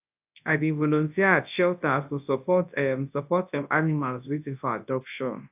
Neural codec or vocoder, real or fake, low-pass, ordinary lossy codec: codec, 24 kHz, 0.9 kbps, WavTokenizer, large speech release; fake; 3.6 kHz; AAC, 32 kbps